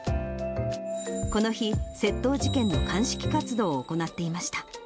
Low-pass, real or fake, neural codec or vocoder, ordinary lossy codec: none; real; none; none